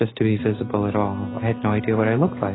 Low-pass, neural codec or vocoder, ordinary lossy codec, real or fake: 7.2 kHz; none; AAC, 16 kbps; real